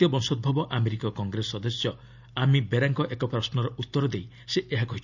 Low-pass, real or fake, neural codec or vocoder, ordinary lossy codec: 7.2 kHz; real; none; none